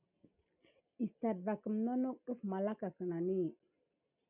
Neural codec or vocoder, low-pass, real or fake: none; 3.6 kHz; real